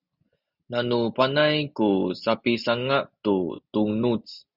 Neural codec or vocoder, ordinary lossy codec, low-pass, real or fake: none; Opus, 64 kbps; 5.4 kHz; real